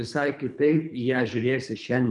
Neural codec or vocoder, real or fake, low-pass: codec, 24 kHz, 3 kbps, HILCodec; fake; 10.8 kHz